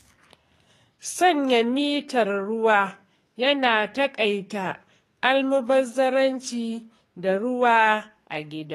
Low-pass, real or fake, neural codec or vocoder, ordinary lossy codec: 14.4 kHz; fake; codec, 44.1 kHz, 2.6 kbps, SNAC; AAC, 48 kbps